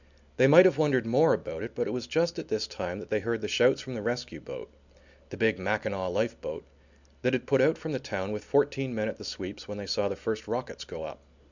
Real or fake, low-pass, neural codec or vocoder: real; 7.2 kHz; none